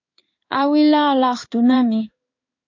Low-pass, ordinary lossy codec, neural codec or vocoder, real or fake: 7.2 kHz; AAC, 48 kbps; codec, 16 kHz in and 24 kHz out, 1 kbps, XY-Tokenizer; fake